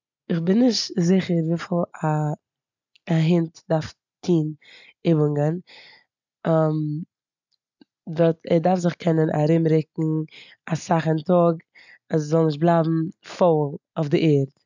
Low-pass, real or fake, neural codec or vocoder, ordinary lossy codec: 7.2 kHz; real; none; none